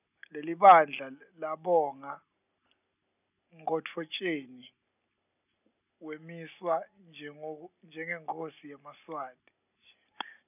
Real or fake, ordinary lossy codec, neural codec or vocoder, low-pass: real; none; none; 3.6 kHz